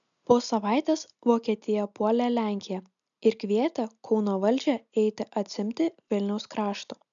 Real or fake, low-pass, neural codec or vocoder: real; 7.2 kHz; none